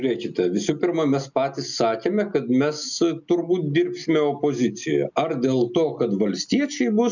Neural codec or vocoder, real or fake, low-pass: none; real; 7.2 kHz